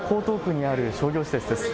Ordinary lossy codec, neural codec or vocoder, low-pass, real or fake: none; none; none; real